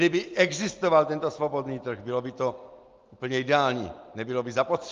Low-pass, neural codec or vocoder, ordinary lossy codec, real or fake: 7.2 kHz; none; Opus, 32 kbps; real